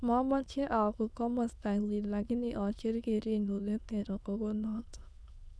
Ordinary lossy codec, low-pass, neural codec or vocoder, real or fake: none; none; autoencoder, 22.05 kHz, a latent of 192 numbers a frame, VITS, trained on many speakers; fake